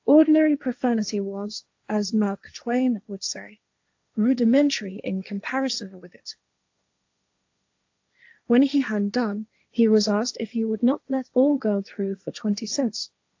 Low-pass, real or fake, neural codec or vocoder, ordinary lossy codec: 7.2 kHz; fake; codec, 16 kHz, 1.1 kbps, Voila-Tokenizer; AAC, 48 kbps